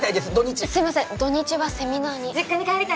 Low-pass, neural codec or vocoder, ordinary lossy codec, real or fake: none; none; none; real